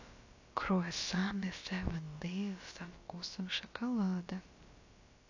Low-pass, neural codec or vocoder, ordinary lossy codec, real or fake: 7.2 kHz; codec, 16 kHz, about 1 kbps, DyCAST, with the encoder's durations; AAC, 48 kbps; fake